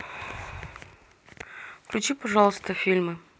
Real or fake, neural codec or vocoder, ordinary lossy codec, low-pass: real; none; none; none